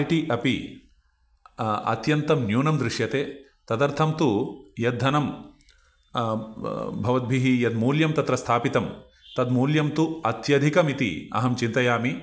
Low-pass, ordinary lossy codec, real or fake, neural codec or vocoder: none; none; real; none